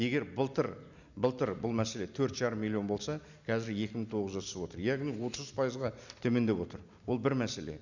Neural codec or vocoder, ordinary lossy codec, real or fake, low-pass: none; none; real; 7.2 kHz